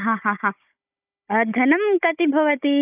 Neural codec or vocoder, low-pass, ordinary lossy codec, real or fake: codec, 16 kHz, 16 kbps, FunCodec, trained on Chinese and English, 50 frames a second; 3.6 kHz; none; fake